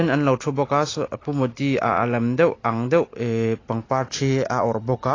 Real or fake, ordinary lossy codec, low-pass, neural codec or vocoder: real; AAC, 32 kbps; 7.2 kHz; none